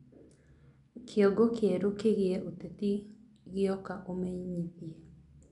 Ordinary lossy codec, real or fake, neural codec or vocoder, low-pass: none; real; none; 10.8 kHz